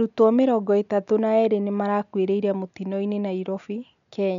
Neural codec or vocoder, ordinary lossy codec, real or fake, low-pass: none; MP3, 96 kbps; real; 7.2 kHz